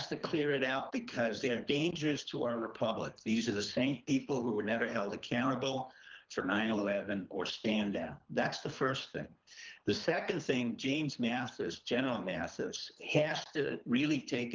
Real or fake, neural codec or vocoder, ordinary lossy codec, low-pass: fake; codec, 24 kHz, 3 kbps, HILCodec; Opus, 24 kbps; 7.2 kHz